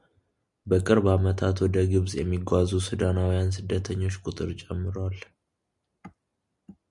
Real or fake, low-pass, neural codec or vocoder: real; 10.8 kHz; none